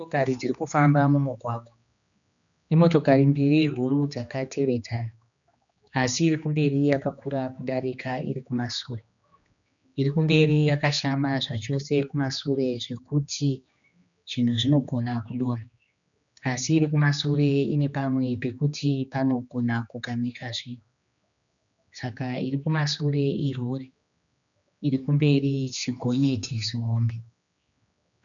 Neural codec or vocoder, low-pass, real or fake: codec, 16 kHz, 2 kbps, X-Codec, HuBERT features, trained on general audio; 7.2 kHz; fake